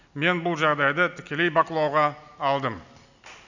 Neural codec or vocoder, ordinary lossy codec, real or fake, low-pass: none; none; real; 7.2 kHz